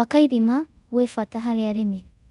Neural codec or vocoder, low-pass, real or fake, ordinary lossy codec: codec, 24 kHz, 0.5 kbps, DualCodec; 10.8 kHz; fake; none